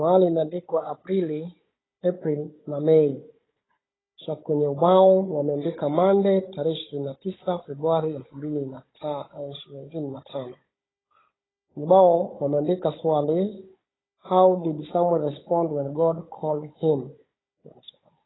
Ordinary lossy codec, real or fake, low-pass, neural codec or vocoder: AAC, 16 kbps; fake; 7.2 kHz; codec, 16 kHz, 16 kbps, FunCodec, trained on Chinese and English, 50 frames a second